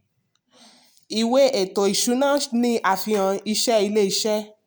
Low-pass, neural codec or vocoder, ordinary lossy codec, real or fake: none; none; none; real